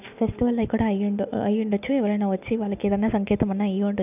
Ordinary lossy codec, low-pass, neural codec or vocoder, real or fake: none; 3.6 kHz; none; real